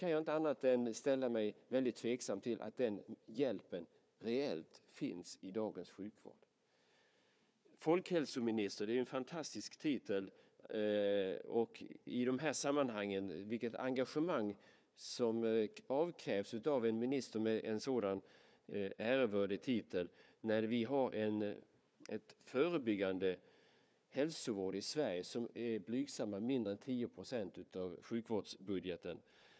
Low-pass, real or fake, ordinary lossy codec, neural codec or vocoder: none; fake; none; codec, 16 kHz, 4 kbps, FunCodec, trained on Chinese and English, 50 frames a second